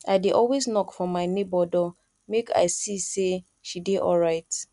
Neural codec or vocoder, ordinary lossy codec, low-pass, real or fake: none; none; 10.8 kHz; real